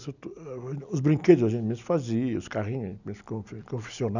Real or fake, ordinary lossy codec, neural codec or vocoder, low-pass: real; none; none; 7.2 kHz